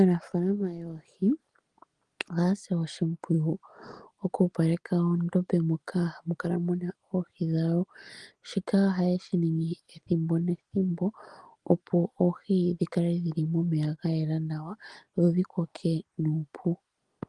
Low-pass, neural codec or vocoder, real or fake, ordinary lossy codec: 10.8 kHz; none; real; Opus, 24 kbps